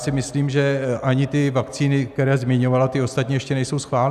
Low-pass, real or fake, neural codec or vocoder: 14.4 kHz; real; none